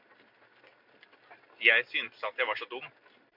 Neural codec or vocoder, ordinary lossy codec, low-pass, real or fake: none; MP3, 48 kbps; 5.4 kHz; real